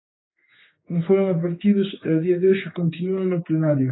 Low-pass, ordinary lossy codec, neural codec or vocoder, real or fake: 7.2 kHz; AAC, 16 kbps; codec, 16 kHz, 4 kbps, X-Codec, HuBERT features, trained on general audio; fake